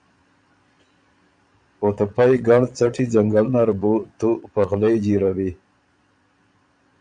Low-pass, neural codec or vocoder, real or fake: 9.9 kHz; vocoder, 22.05 kHz, 80 mel bands, Vocos; fake